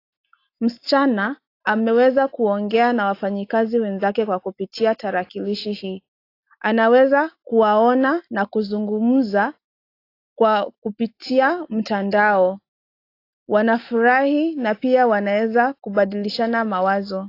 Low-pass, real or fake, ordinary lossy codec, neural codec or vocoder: 5.4 kHz; real; AAC, 32 kbps; none